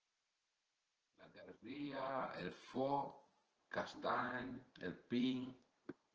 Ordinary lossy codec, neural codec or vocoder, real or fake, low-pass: Opus, 16 kbps; vocoder, 44.1 kHz, 80 mel bands, Vocos; fake; 7.2 kHz